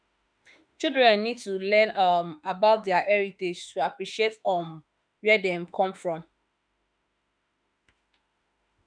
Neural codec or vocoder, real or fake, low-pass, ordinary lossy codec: autoencoder, 48 kHz, 32 numbers a frame, DAC-VAE, trained on Japanese speech; fake; 9.9 kHz; none